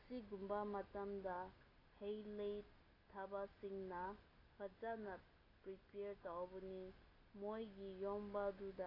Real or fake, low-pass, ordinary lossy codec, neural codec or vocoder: real; 5.4 kHz; AAC, 24 kbps; none